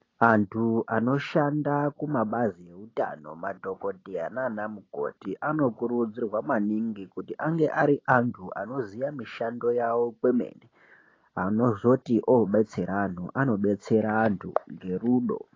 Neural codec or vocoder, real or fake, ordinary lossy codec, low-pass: none; real; AAC, 32 kbps; 7.2 kHz